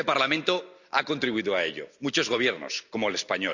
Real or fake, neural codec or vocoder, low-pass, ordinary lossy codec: real; none; 7.2 kHz; none